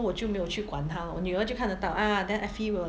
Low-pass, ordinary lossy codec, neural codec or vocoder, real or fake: none; none; none; real